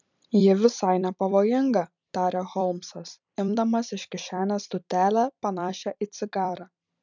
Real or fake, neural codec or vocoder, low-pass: fake; vocoder, 44.1 kHz, 128 mel bands every 256 samples, BigVGAN v2; 7.2 kHz